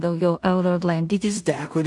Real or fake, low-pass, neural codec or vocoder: fake; 10.8 kHz; codec, 16 kHz in and 24 kHz out, 0.4 kbps, LongCat-Audio-Codec, two codebook decoder